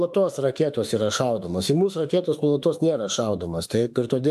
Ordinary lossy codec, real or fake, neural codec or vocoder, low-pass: AAC, 96 kbps; fake; autoencoder, 48 kHz, 32 numbers a frame, DAC-VAE, trained on Japanese speech; 14.4 kHz